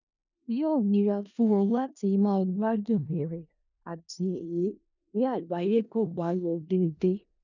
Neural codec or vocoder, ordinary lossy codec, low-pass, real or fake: codec, 16 kHz in and 24 kHz out, 0.4 kbps, LongCat-Audio-Codec, four codebook decoder; none; 7.2 kHz; fake